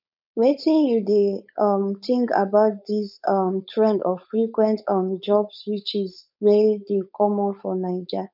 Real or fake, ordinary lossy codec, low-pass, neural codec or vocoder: fake; none; 5.4 kHz; codec, 16 kHz, 4.8 kbps, FACodec